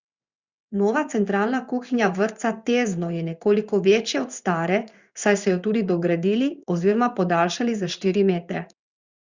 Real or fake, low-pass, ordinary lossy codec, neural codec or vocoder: fake; 7.2 kHz; Opus, 64 kbps; codec, 16 kHz in and 24 kHz out, 1 kbps, XY-Tokenizer